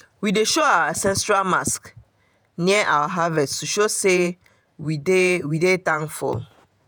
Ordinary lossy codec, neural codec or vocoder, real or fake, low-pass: none; vocoder, 48 kHz, 128 mel bands, Vocos; fake; none